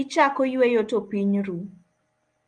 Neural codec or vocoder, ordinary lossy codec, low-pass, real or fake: none; Opus, 32 kbps; 9.9 kHz; real